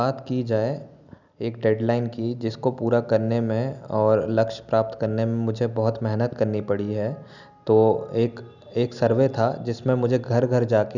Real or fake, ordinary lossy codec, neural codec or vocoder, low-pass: real; none; none; 7.2 kHz